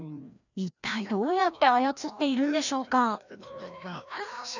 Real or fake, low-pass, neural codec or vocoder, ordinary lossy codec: fake; 7.2 kHz; codec, 16 kHz, 1 kbps, FreqCodec, larger model; none